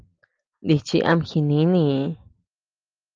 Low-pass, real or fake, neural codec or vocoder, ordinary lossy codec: 7.2 kHz; real; none; Opus, 24 kbps